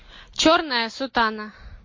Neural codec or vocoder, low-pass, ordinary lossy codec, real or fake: none; 7.2 kHz; MP3, 32 kbps; real